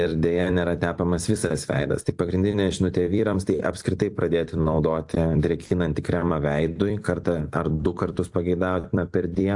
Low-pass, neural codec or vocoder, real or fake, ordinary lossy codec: 10.8 kHz; vocoder, 44.1 kHz, 128 mel bands every 256 samples, BigVGAN v2; fake; AAC, 64 kbps